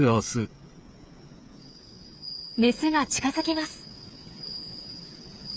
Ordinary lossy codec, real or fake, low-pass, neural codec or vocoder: none; fake; none; codec, 16 kHz, 8 kbps, FreqCodec, smaller model